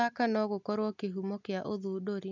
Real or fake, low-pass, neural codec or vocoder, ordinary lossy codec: real; 7.2 kHz; none; none